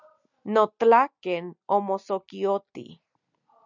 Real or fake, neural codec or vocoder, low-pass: real; none; 7.2 kHz